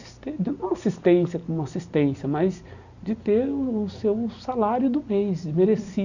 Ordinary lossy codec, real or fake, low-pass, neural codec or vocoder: none; real; 7.2 kHz; none